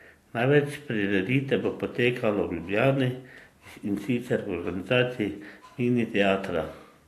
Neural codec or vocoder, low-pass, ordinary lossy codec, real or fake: none; 14.4 kHz; AAC, 64 kbps; real